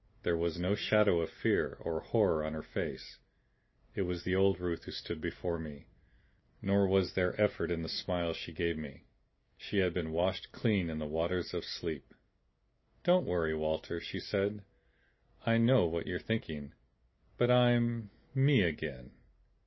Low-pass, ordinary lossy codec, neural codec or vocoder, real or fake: 7.2 kHz; MP3, 24 kbps; none; real